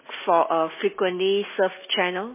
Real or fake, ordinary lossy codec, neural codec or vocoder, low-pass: real; MP3, 16 kbps; none; 3.6 kHz